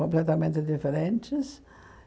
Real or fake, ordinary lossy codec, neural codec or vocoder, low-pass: real; none; none; none